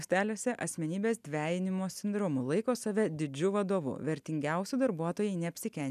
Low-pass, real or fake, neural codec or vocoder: 14.4 kHz; real; none